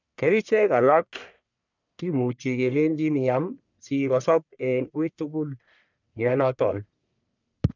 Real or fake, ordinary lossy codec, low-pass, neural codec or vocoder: fake; none; 7.2 kHz; codec, 44.1 kHz, 1.7 kbps, Pupu-Codec